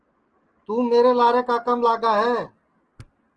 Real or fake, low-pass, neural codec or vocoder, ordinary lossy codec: real; 9.9 kHz; none; Opus, 16 kbps